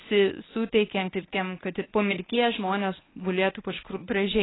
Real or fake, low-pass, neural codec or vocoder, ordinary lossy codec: fake; 7.2 kHz; codec, 24 kHz, 0.9 kbps, WavTokenizer, medium speech release version 1; AAC, 16 kbps